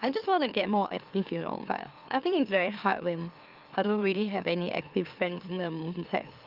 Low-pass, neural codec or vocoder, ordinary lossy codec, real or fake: 5.4 kHz; autoencoder, 44.1 kHz, a latent of 192 numbers a frame, MeloTTS; Opus, 32 kbps; fake